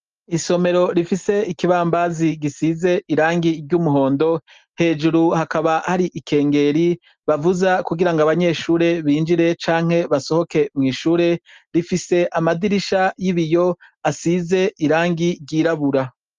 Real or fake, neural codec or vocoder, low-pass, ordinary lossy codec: real; none; 7.2 kHz; Opus, 24 kbps